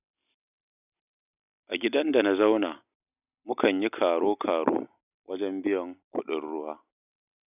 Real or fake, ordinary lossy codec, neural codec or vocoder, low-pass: real; none; none; 3.6 kHz